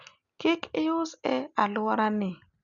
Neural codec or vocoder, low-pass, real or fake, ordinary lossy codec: none; 7.2 kHz; real; none